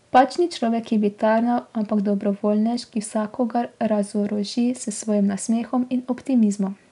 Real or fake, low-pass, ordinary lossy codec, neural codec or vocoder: real; 10.8 kHz; none; none